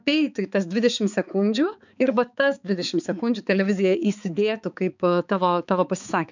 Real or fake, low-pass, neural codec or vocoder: fake; 7.2 kHz; codec, 16 kHz, 4 kbps, X-Codec, HuBERT features, trained on balanced general audio